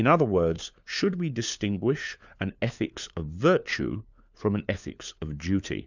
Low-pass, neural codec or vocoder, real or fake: 7.2 kHz; codec, 16 kHz, 4 kbps, FunCodec, trained on LibriTTS, 50 frames a second; fake